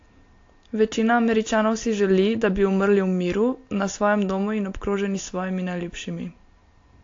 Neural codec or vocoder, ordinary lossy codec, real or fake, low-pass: none; AAC, 48 kbps; real; 7.2 kHz